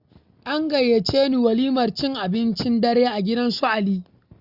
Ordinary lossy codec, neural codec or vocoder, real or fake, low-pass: Opus, 64 kbps; none; real; 5.4 kHz